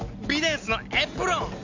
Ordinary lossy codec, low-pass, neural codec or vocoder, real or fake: AAC, 48 kbps; 7.2 kHz; none; real